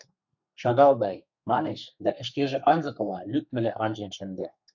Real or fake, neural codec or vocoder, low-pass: fake; codec, 32 kHz, 1.9 kbps, SNAC; 7.2 kHz